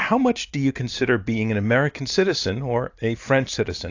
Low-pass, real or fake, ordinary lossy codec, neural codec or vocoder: 7.2 kHz; real; AAC, 48 kbps; none